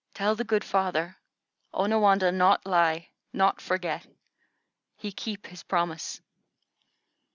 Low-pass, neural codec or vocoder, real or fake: 7.2 kHz; none; real